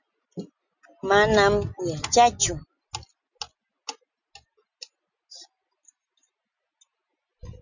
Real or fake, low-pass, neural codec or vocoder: real; 7.2 kHz; none